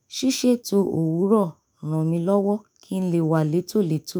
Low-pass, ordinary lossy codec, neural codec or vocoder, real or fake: none; none; autoencoder, 48 kHz, 128 numbers a frame, DAC-VAE, trained on Japanese speech; fake